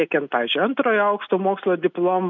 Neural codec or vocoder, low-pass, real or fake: none; 7.2 kHz; real